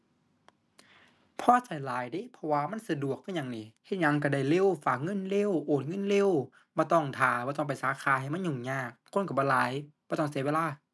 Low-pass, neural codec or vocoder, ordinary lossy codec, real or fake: none; none; none; real